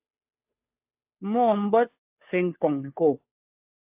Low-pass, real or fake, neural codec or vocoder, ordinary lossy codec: 3.6 kHz; fake; codec, 16 kHz, 2 kbps, FunCodec, trained on Chinese and English, 25 frames a second; AAC, 32 kbps